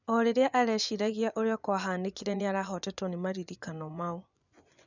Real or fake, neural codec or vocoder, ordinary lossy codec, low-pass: fake; vocoder, 24 kHz, 100 mel bands, Vocos; none; 7.2 kHz